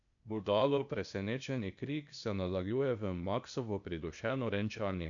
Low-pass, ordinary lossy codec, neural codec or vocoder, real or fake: 7.2 kHz; none; codec, 16 kHz, 0.8 kbps, ZipCodec; fake